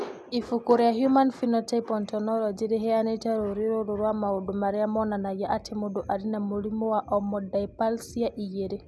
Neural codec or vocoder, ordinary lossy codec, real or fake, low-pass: none; none; real; none